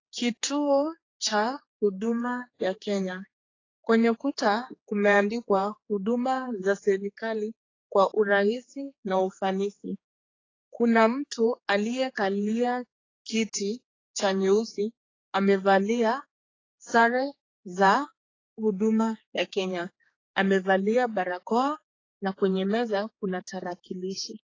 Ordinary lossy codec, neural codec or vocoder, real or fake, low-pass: AAC, 32 kbps; codec, 16 kHz, 4 kbps, X-Codec, HuBERT features, trained on general audio; fake; 7.2 kHz